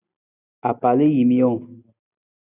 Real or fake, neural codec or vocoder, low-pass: real; none; 3.6 kHz